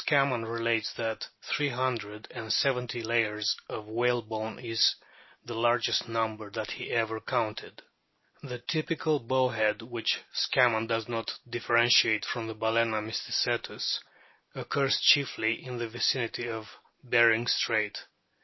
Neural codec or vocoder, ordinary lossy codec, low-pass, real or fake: none; MP3, 24 kbps; 7.2 kHz; real